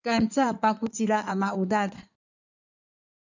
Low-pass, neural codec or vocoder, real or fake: 7.2 kHz; codec, 16 kHz in and 24 kHz out, 2.2 kbps, FireRedTTS-2 codec; fake